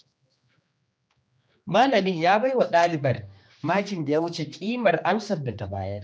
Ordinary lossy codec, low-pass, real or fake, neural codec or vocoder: none; none; fake; codec, 16 kHz, 2 kbps, X-Codec, HuBERT features, trained on general audio